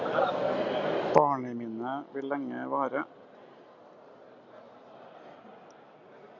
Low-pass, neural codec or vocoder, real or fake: 7.2 kHz; none; real